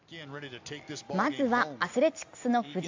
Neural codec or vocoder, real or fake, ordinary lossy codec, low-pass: none; real; AAC, 48 kbps; 7.2 kHz